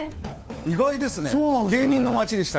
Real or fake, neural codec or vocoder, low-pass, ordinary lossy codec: fake; codec, 16 kHz, 4 kbps, FunCodec, trained on LibriTTS, 50 frames a second; none; none